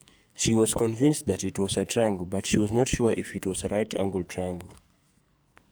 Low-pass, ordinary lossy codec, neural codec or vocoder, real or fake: none; none; codec, 44.1 kHz, 2.6 kbps, SNAC; fake